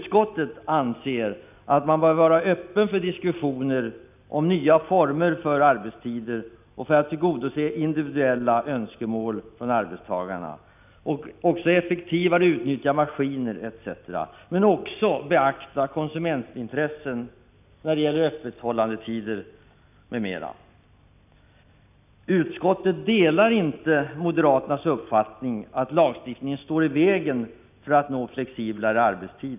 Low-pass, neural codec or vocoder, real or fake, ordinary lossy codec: 3.6 kHz; none; real; none